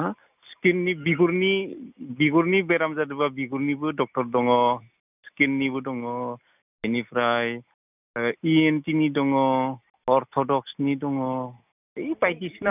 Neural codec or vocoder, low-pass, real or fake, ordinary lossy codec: none; 3.6 kHz; real; none